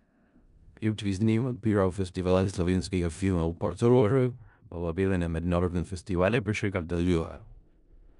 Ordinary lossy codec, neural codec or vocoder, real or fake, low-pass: none; codec, 16 kHz in and 24 kHz out, 0.4 kbps, LongCat-Audio-Codec, four codebook decoder; fake; 10.8 kHz